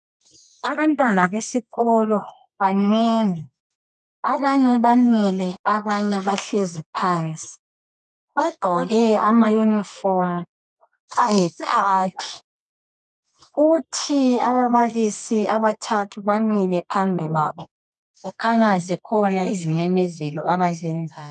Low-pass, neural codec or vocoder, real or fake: 10.8 kHz; codec, 24 kHz, 0.9 kbps, WavTokenizer, medium music audio release; fake